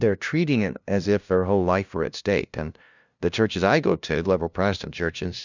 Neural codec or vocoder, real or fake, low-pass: codec, 16 kHz, 0.5 kbps, FunCodec, trained on LibriTTS, 25 frames a second; fake; 7.2 kHz